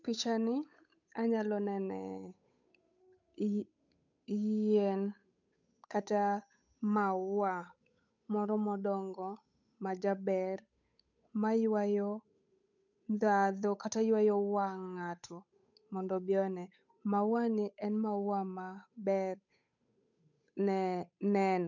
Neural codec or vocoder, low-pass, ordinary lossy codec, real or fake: codec, 16 kHz, 8 kbps, FunCodec, trained on Chinese and English, 25 frames a second; 7.2 kHz; none; fake